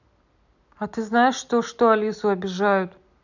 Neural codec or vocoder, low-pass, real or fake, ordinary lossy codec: none; 7.2 kHz; real; none